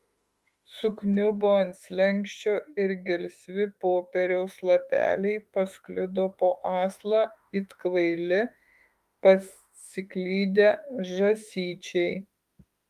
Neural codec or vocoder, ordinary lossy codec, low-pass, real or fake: autoencoder, 48 kHz, 32 numbers a frame, DAC-VAE, trained on Japanese speech; Opus, 32 kbps; 14.4 kHz; fake